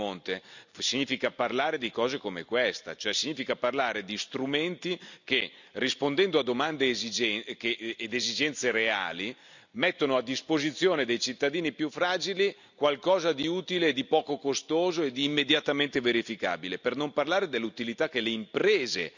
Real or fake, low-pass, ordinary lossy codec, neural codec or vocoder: real; 7.2 kHz; none; none